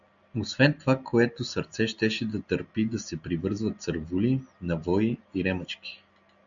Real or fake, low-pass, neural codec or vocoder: real; 7.2 kHz; none